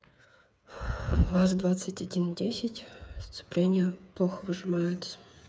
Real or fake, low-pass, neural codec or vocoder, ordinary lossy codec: fake; none; codec, 16 kHz, 8 kbps, FreqCodec, smaller model; none